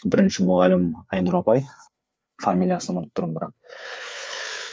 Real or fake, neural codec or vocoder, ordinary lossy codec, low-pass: fake; codec, 16 kHz, 4 kbps, FreqCodec, larger model; none; none